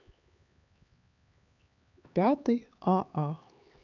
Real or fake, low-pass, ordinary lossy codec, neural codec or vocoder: fake; 7.2 kHz; none; codec, 16 kHz, 4 kbps, X-Codec, HuBERT features, trained on LibriSpeech